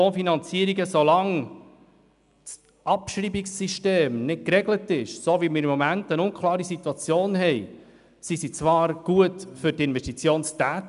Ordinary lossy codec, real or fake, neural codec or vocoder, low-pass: none; real; none; 10.8 kHz